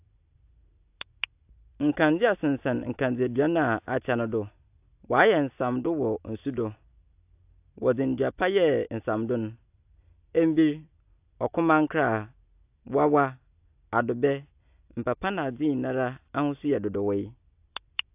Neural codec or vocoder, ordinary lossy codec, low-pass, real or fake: none; AAC, 32 kbps; 3.6 kHz; real